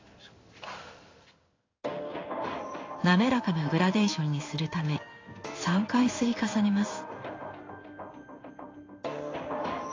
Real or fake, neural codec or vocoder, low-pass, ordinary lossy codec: fake; codec, 16 kHz in and 24 kHz out, 1 kbps, XY-Tokenizer; 7.2 kHz; AAC, 32 kbps